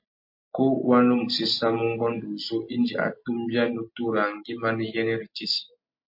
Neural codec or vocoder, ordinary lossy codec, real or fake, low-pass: none; MP3, 32 kbps; real; 5.4 kHz